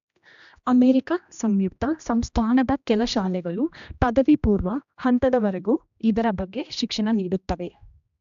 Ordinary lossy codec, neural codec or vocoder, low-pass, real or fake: AAC, 96 kbps; codec, 16 kHz, 1 kbps, X-Codec, HuBERT features, trained on general audio; 7.2 kHz; fake